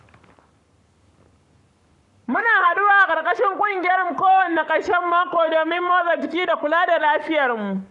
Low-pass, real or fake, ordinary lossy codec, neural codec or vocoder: 10.8 kHz; fake; none; codec, 44.1 kHz, 7.8 kbps, Pupu-Codec